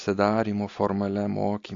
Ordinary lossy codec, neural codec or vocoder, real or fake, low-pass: AAC, 48 kbps; none; real; 7.2 kHz